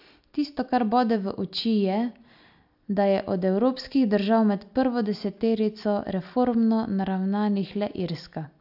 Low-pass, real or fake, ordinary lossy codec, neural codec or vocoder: 5.4 kHz; real; AAC, 48 kbps; none